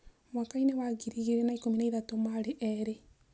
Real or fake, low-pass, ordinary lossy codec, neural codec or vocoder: real; none; none; none